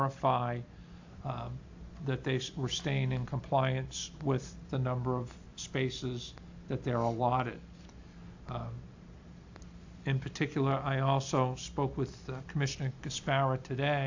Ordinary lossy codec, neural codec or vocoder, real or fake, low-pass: AAC, 48 kbps; none; real; 7.2 kHz